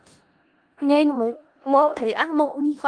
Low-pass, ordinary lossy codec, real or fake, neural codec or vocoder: 9.9 kHz; Opus, 24 kbps; fake; codec, 16 kHz in and 24 kHz out, 0.4 kbps, LongCat-Audio-Codec, four codebook decoder